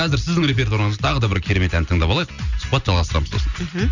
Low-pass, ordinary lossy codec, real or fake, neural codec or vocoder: 7.2 kHz; none; real; none